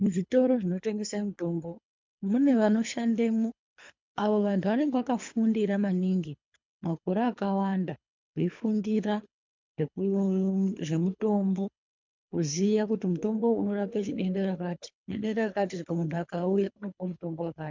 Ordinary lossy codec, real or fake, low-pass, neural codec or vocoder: MP3, 64 kbps; fake; 7.2 kHz; codec, 24 kHz, 3 kbps, HILCodec